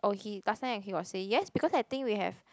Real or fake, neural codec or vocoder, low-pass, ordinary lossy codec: real; none; none; none